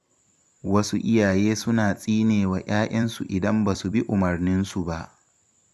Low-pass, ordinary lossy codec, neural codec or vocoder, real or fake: 14.4 kHz; none; vocoder, 44.1 kHz, 128 mel bands every 256 samples, BigVGAN v2; fake